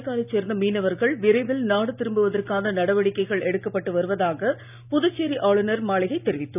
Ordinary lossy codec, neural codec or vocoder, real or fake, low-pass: none; none; real; 3.6 kHz